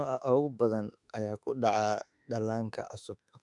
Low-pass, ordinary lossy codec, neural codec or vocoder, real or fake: 10.8 kHz; none; autoencoder, 48 kHz, 32 numbers a frame, DAC-VAE, trained on Japanese speech; fake